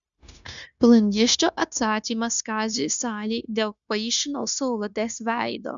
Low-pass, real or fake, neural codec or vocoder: 7.2 kHz; fake; codec, 16 kHz, 0.9 kbps, LongCat-Audio-Codec